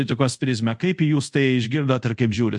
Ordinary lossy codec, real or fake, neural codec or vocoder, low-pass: MP3, 64 kbps; fake; codec, 24 kHz, 0.5 kbps, DualCodec; 10.8 kHz